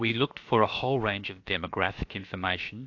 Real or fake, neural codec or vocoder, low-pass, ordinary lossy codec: fake; codec, 16 kHz, about 1 kbps, DyCAST, with the encoder's durations; 7.2 kHz; AAC, 48 kbps